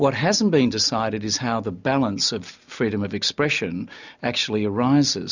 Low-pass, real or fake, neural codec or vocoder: 7.2 kHz; real; none